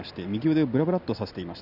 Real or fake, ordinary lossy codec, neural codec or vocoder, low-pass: real; none; none; 5.4 kHz